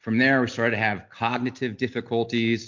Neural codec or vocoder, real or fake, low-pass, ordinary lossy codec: none; real; 7.2 kHz; MP3, 48 kbps